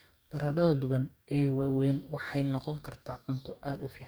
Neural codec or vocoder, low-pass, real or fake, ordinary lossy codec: codec, 44.1 kHz, 2.6 kbps, DAC; none; fake; none